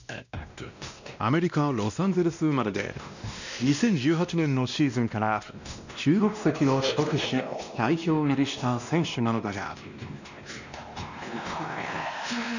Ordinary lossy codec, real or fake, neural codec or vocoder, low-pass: none; fake; codec, 16 kHz, 1 kbps, X-Codec, WavLM features, trained on Multilingual LibriSpeech; 7.2 kHz